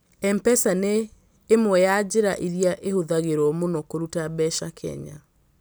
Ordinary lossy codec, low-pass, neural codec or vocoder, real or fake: none; none; none; real